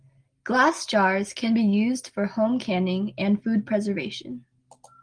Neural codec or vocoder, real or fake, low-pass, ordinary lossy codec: none; real; 9.9 kHz; Opus, 24 kbps